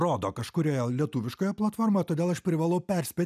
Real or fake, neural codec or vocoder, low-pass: real; none; 14.4 kHz